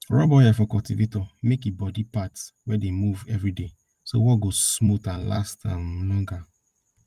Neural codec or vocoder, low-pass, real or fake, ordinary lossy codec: vocoder, 44.1 kHz, 128 mel bands every 256 samples, BigVGAN v2; 14.4 kHz; fake; Opus, 32 kbps